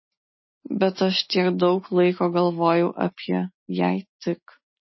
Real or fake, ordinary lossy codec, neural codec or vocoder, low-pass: real; MP3, 24 kbps; none; 7.2 kHz